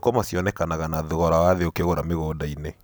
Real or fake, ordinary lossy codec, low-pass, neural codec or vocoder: real; none; none; none